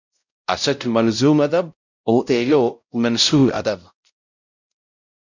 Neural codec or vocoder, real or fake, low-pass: codec, 16 kHz, 0.5 kbps, X-Codec, WavLM features, trained on Multilingual LibriSpeech; fake; 7.2 kHz